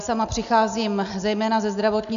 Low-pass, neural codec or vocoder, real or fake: 7.2 kHz; none; real